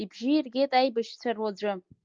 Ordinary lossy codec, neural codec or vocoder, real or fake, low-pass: Opus, 24 kbps; none; real; 7.2 kHz